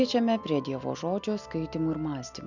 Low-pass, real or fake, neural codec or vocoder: 7.2 kHz; real; none